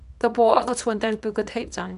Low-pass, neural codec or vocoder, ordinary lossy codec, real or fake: 10.8 kHz; codec, 24 kHz, 0.9 kbps, WavTokenizer, small release; MP3, 96 kbps; fake